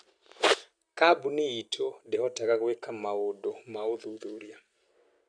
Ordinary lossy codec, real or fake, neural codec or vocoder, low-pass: none; real; none; 9.9 kHz